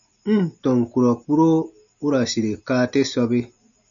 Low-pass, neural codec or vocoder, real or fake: 7.2 kHz; none; real